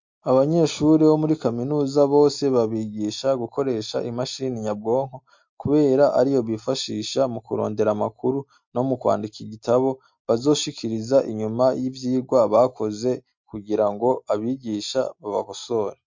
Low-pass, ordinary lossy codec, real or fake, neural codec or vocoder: 7.2 kHz; MP3, 48 kbps; real; none